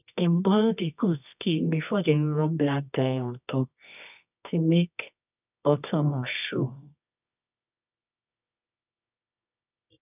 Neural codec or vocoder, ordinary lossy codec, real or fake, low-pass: codec, 24 kHz, 0.9 kbps, WavTokenizer, medium music audio release; none; fake; 3.6 kHz